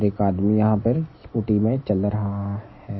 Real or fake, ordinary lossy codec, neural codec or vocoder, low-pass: real; MP3, 24 kbps; none; 7.2 kHz